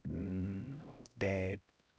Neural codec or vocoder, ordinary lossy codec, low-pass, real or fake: codec, 16 kHz, 0.5 kbps, X-Codec, HuBERT features, trained on LibriSpeech; none; none; fake